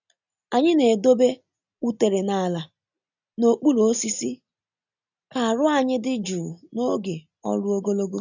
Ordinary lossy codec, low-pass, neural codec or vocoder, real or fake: none; 7.2 kHz; none; real